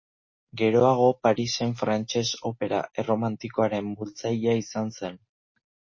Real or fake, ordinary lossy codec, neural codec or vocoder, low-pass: real; MP3, 32 kbps; none; 7.2 kHz